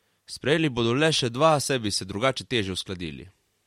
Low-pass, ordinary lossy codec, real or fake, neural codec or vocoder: 19.8 kHz; MP3, 64 kbps; real; none